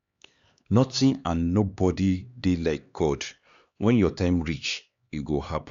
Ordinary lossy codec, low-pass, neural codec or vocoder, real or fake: Opus, 64 kbps; 7.2 kHz; codec, 16 kHz, 2 kbps, X-Codec, HuBERT features, trained on LibriSpeech; fake